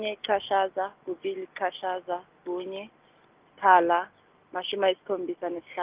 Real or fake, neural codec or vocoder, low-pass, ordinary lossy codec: real; none; 3.6 kHz; Opus, 24 kbps